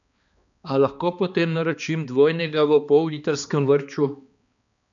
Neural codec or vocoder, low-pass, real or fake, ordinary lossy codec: codec, 16 kHz, 2 kbps, X-Codec, HuBERT features, trained on balanced general audio; 7.2 kHz; fake; none